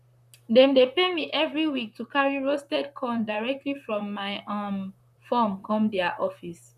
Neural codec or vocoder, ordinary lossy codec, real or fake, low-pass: vocoder, 44.1 kHz, 128 mel bands, Pupu-Vocoder; none; fake; 14.4 kHz